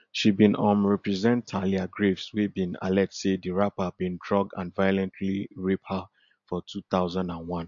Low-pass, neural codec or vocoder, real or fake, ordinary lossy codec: 7.2 kHz; none; real; MP3, 48 kbps